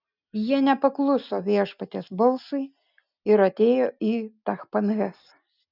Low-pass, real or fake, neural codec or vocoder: 5.4 kHz; real; none